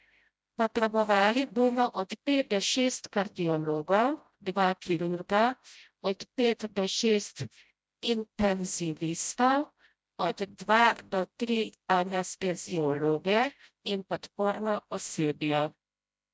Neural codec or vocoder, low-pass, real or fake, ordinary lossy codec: codec, 16 kHz, 0.5 kbps, FreqCodec, smaller model; none; fake; none